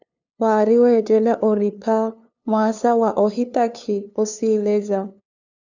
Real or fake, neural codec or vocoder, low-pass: fake; codec, 16 kHz, 2 kbps, FunCodec, trained on LibriTTS, 25 frames a second; 7.2 kHz